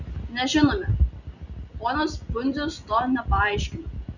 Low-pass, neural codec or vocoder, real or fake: 7.2 kHz; none; real